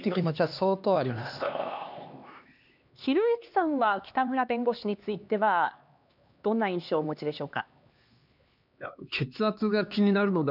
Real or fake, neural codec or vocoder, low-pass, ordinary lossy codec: fake; codec, 16 kHz, 2 kbps, X-Codec, HuBERT features, trained on LibriSpeech; 5.4 kHz; none